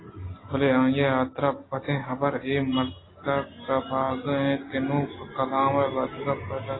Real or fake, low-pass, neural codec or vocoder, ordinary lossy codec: real; 7.2 kHz; none; AAC, 16 kbps